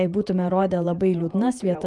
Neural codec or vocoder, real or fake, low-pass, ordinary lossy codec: vocoder, 48 kHz, 128 mel bands, Vocos; fake; 10.8 kHz; Opus, 32 kbps